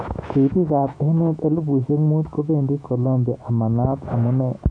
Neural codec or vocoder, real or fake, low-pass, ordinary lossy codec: autoencoder, 48 kHz, 128 numbers a frame, DAC-VAE, trained on Japanese speech; fake; 9.9 kHz; AAC, 64 kbps